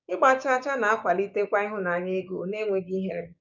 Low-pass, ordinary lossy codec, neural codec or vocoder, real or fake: 7.2 kHz; none; codec, 16 kHz, 6 kbps, DAC; fake